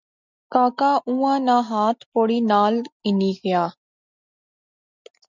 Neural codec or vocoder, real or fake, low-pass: none; real; 7.2 kHz